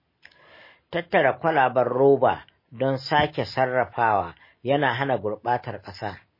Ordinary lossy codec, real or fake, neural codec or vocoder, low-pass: MP3, 24 kbps; real; none; 5.4 kHz